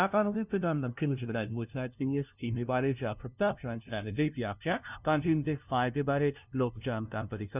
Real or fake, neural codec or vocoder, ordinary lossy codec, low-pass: fake; codec, 16 kHz, 0.5 kbps, FunCodec, trained on LibriTTS, 25 frames a second; none; 3.6 kHz